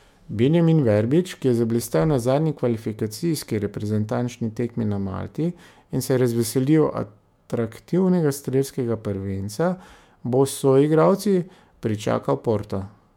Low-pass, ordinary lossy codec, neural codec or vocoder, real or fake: 19.8 kHz; MP3, 96 kbps; autoencoder, 48 kHz, 128 numbers a frame, DAC-VAE, trained on Japanese speech; fake